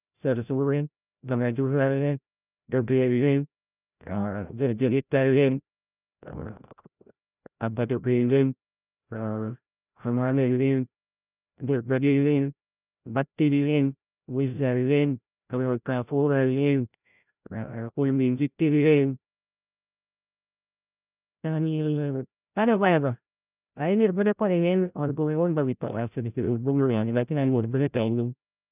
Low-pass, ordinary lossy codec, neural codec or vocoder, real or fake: 3.6 kHz; none; codec, 16 kHz, 0.5 kbps, FreqCodec, larger model; fake